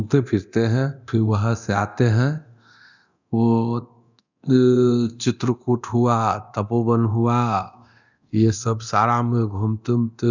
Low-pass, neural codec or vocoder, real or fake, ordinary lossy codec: 7.2 kHz; codec, 24 kHz, 0.9 kbps, DualCodec; fake; none